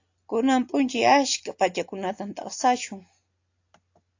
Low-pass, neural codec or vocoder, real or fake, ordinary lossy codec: 7.2 kHz; none; real; AAC, 48 kbps